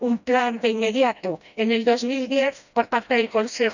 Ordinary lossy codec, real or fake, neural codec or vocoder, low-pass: none; fake; codec, 16 kHz, 1 kbps, FreqCodec, smaller model; 7.2 kHz